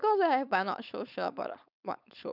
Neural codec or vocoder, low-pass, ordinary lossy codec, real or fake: codec, 16 kHz, 4.8 kbps, FACodec; 5.4 kHz; none; fake